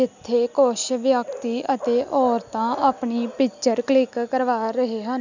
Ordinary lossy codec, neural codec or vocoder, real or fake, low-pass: none; none; real; 7.2 kHz